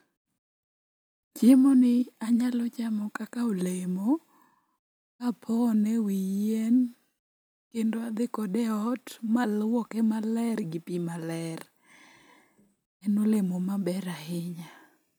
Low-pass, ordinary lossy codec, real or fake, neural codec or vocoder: none; none; real; none